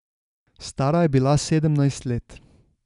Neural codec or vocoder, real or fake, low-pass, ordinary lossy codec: none; real; 10.8 kHz; none